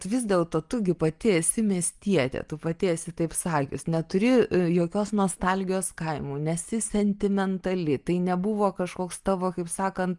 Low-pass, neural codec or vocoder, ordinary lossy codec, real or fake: 10.8 kHz; none; Opus, 32 kbps; real